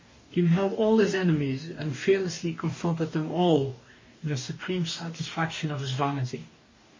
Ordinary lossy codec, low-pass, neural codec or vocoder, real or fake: MP3, 32 kbps; 7.2 kHz; codec, 44.1 kHz, 2.6 kbps, DAC; fake